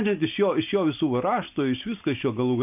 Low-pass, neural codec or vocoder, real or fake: 3.6 kHz; none; real